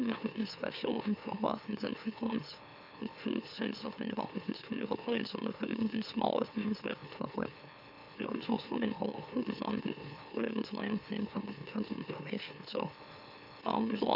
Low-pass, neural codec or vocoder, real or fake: 5.4 kHz; autoencoder, 44.1 kHz, a latent of 192 numbers a frame, MeloTTS; fake